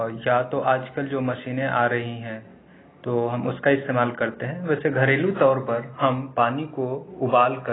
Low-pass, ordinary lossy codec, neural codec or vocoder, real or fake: 7.2 kHz; AAC, 16 kbps; none; real